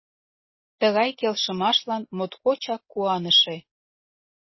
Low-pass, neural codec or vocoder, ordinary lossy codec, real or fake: 7.2 kHz; none; MP3, 24 kbps; real